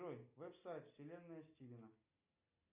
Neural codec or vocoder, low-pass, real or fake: none; 3.6 kHz; real